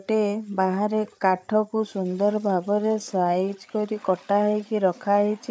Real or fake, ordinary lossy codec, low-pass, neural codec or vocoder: fake; none; none; codec, 16 kHz, 8 kbps, FreqCodec, larger model